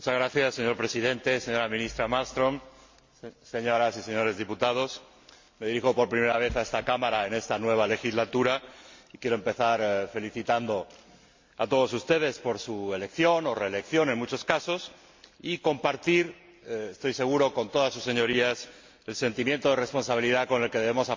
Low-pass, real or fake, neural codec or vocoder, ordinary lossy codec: 7.2 kHz; real; none; MP3, 48 kbps